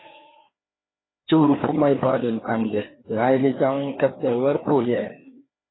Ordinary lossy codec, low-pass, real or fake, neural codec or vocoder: AAC, 16 kbps; 7.2 kHz; fake; codec, 16 kHz, 2 kbps, FreqCodec, larger model